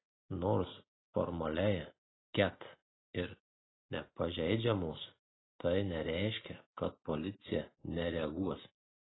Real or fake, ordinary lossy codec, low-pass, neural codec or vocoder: real; AAC, 16 kbps; 7.2 kHz; none